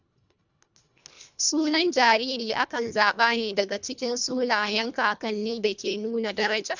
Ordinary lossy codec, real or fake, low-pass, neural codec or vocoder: none; fake; 7.2 kHz; codec, 24 kHz, 1.5 kbps, HILCodec